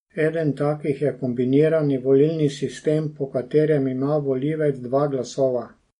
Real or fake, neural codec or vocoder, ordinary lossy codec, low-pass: real; none; MP3, 48 kbps; 19.8 kHz